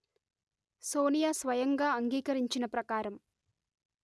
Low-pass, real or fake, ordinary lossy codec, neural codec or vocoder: none; real; none; none